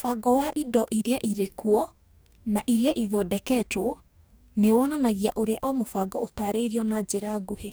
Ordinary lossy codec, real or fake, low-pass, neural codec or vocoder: none; fake; none; codec, 44.1 kHz, 2.6 kbps, DAC